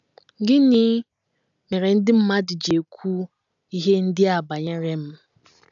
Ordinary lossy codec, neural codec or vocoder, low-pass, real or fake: none; none; 7.2 kHz; real